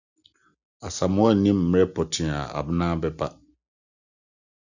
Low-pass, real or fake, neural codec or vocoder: 7.2 kHz; real; none